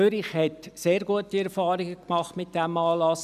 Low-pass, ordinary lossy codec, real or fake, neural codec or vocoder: 14.4 kHz; none; real; none